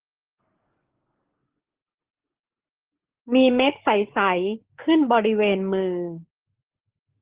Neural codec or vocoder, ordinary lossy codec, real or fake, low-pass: codec, 44.1 kHz, 7.8 kbps, DAC; Opus, 16 kbps; fake; 3.6 kHz